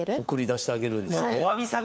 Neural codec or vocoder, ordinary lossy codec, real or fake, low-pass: codec, 16 kHz, 4 kbps, FunCodec, trained on LibriTTS, 50 frames a second; none; fake; none